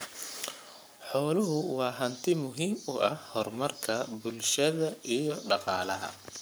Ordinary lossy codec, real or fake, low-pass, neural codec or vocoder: none; fake; none; codec, 44.1 kHz, 7.8 kbps, Pupu-Codec